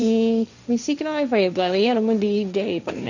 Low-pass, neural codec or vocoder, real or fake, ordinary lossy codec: 7.2 kHz; codec, 16 kHz, 1.1 kbps, Voila-Tokenizer; fake; none